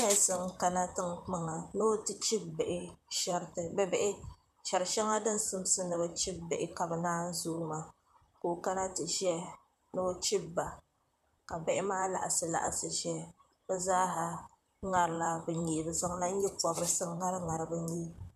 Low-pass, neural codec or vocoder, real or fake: 14.4 kHz; vocoder, 44.1 kHz, 128 mel bands, Pupu-Vocoder; fake